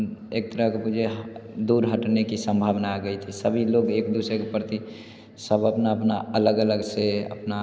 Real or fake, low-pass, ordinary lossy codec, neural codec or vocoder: real; none; none; none